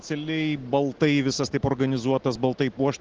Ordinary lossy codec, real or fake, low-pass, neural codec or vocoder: Opus, 16 kbps; real; 7.2 kHz; none